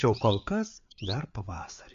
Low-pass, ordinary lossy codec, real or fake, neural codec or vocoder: 7.2 kHz; MP3, 48 kbps; fake; codec, 16 kHz, 4 kbps, FreqCodec, larger model